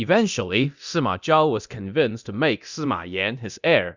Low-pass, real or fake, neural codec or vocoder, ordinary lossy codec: 7.2 kHz; fake; codec, 24 kHz, 0.9 kbps, DualCodec; Opus, 64 kbps